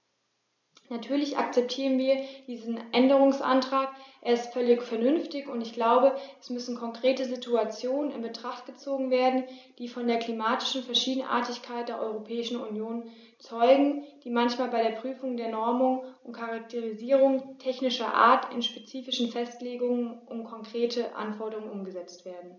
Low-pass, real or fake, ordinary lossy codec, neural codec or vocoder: 7.2 kHz; real; none; none